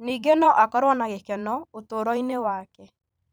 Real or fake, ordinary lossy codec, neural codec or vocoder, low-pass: fake; none; vocoder, 44.1 kHz, 128 mel bands every 512 samples, BigVGAN v2; none